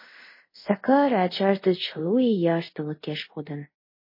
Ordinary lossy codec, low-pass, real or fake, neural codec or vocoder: MP3, 24 kbps; 5.4 kHz; fake; codec, 24 kHz, 0.5 kbps, DualCodec